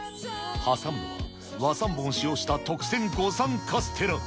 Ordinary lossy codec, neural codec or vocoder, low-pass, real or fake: none; none; none; real